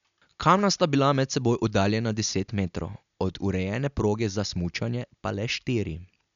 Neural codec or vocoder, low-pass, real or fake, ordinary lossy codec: none; 7.2 kHz; real; none